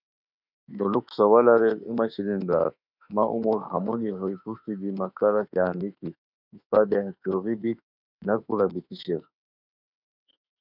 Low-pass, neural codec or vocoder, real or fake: 5.4 kHz; autoencoder, 48 kHz, 32 numbers a frame, DAC-VAE, trained on Japanese speech; fake